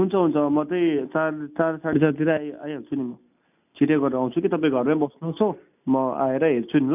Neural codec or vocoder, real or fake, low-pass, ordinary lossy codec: none; real; 3.6 kHz; none